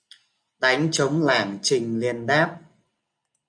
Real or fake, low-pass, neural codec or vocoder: real; 9.9 kHz; none